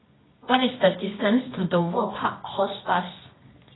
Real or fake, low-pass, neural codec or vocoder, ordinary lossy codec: fake; 7.2 kHz; codec, 24 kHz, 0.9 kbps, WavTokenizer, medium music audio release; AAC, 16 kbps